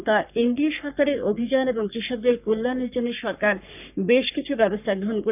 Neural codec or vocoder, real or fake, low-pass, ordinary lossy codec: codec, 44.1 kHz, 3.4 kbps, Pupu-Codec; fake; 3.6 kHz; none